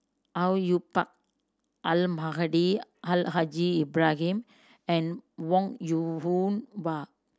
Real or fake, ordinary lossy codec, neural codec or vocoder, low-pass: real; none; none; none